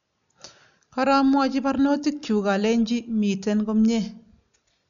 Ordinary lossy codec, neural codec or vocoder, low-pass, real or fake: none; none; 7.2 kHz; real